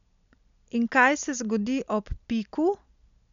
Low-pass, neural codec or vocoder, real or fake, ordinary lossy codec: 7.2 kHz; none; real; none